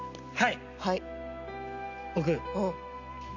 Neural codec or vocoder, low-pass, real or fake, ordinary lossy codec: none; 7.2 kHz; real; none